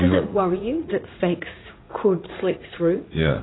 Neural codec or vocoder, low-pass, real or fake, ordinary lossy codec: vocoder, 44.1 kHz, 80 mel bands, Vocos; 7.2 kHz; fake; AAC, 16 kbps